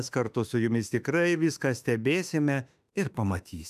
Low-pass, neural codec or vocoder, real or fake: 14.4 kHz; autoencoder, 48 kHz, 32 numbers a frame, DAC-VAE, trained on Japanese speech; fake